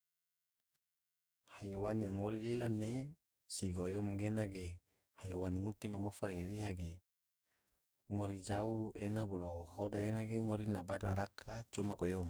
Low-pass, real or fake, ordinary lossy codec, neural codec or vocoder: none; fake; none; codec, 44.1 kHz, 2.6 kbps, DAC